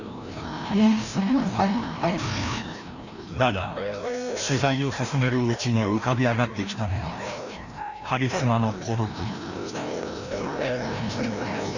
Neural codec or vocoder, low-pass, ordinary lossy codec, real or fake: codec, 16 kHz, 1 kbps, FreqCodec, larger model; 7.2 kHz; none; fake